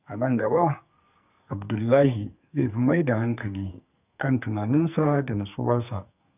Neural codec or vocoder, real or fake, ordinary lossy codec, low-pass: codec, 44.1 kHz, 2.6 kbps, SNAC; fake; none; 3.6 kHz